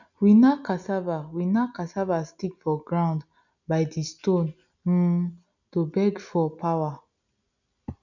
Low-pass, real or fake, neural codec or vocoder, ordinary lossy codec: 7.2 kHz; real; none; none